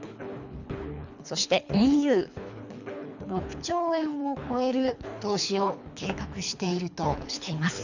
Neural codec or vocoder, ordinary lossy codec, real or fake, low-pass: codec, 24 kHz, 3 kbps, HILCodec; none; fake; 7.2 kHz